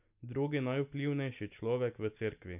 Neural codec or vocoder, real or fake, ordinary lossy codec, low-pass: none; real; none; 3.6 kHz